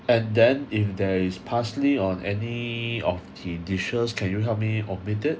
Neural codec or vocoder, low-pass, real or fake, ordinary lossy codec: none; none; real; none